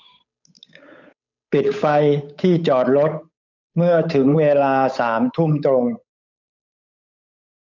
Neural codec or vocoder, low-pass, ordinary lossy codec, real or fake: codec, 16 kHz, 8 kbps, FunCodec, trained on Chinese and English, 25 frames a second; 7.2 kHz; none; fake